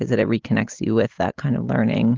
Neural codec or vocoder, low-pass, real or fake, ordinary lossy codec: none; 7.2 kHz; real; Opus, 16 kbps